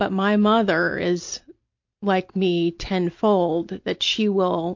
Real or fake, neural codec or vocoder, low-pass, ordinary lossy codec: real; none; 7.2 kHz; MP3, 48 kbps